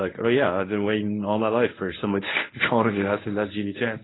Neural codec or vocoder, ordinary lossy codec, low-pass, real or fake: codec, 24 kHz, 0.9 kbps, WavTokenizer, medium speech release version 1; AAC, 16 kbps; 7.2 kHz; fake